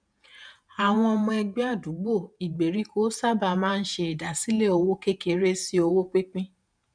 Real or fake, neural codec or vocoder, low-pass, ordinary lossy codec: fake; vocoder, 48 kHz, 128 mel bands, Vocos; 9.9 kHz; none